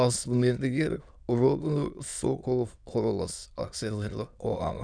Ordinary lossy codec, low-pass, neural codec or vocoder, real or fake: none; 9.9 kHz; autoencoder, 22.05 kHz, a latent of 192 numbers a frame, VITS, trained on many speakers; fake